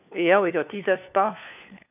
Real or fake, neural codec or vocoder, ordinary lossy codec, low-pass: fake; codec, 16 kHz, 4 kbps, FunCodec, trained on LibriTTS, 50 frames a second; none; 3.6 kHz